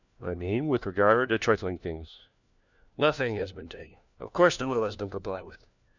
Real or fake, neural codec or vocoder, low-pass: fake; codec, 16 kHz, 1 kbps, FunCodec, trained on LibriTTS, 50 frames a second; 7.2 kHz